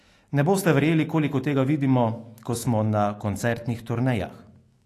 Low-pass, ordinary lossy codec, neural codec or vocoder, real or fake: 14.4 kHz; AAC, 48 kbps; autoencoder, 48 kHz, 128 numbers a frame, DAC-VAE, trained on Japanese speech; fake